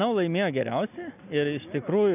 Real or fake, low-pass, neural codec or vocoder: real; 3.6 kHz; none